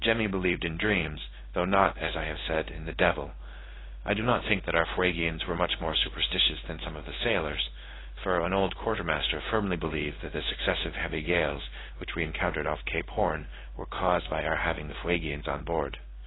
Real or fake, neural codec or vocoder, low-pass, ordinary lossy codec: fake; codec, 16 kHz in and 24 kHz out, 1 kbps, XY-Tokenizer; 7.2 kHz; AAC, 16 kbps